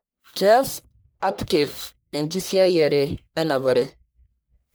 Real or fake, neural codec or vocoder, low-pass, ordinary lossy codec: fake; codec, 44.1 kHz, 1.7 kbps, Pupu-Codec; none; none